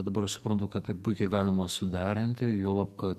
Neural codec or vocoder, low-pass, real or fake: codec, 44.1 kHz, 2.6 kbps, SNAC; 14.4 kHz; fake